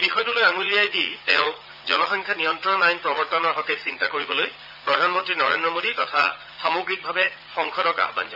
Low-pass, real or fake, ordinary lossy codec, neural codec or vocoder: 5.4 kHz; fake; none; vocoder, 44.1 kHz, 80 mel bands, Vocos